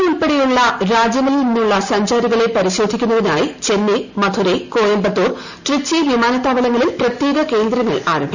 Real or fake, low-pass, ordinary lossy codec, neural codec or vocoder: real; 7.2 kHz; none; none